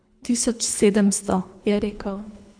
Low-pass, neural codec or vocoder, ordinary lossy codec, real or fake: 9.9 kHz; codec, 24 kHz, 3 kbps, HILCodec; none; fake